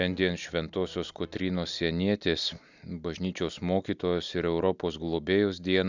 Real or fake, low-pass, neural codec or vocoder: real; 7.2 kHz; none